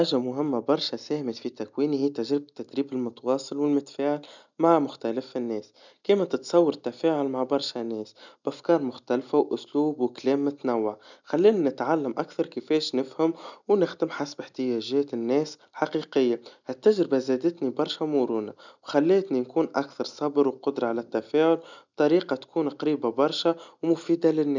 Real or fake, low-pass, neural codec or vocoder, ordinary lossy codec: real; 7.2 kHz; none; none